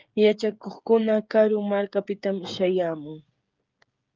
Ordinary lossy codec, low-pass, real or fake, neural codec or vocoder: Opus, 32 kbps; 7.2 kHz; fake; codec, 16 kHz, 16 kbps, FreqCodec, smaller model